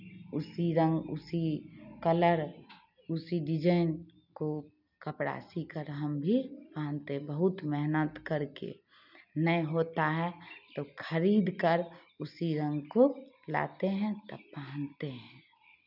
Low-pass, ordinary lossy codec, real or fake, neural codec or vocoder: 5.4 kHz; none; real; none